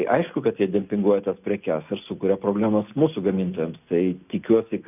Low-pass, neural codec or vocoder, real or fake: 3.6 kHz; none; real